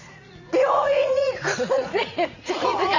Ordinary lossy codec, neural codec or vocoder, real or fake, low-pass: none; vocoder, 44.1 kHz, 80 mel bands, Vocos; fake; 7.2 kHz